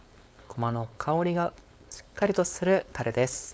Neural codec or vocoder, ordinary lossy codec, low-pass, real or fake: codec, 16 kHz, 4.8 kbps, FACodec; none; none; fake